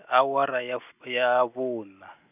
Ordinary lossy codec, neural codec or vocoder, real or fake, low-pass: none; none; real; 3.6 kHz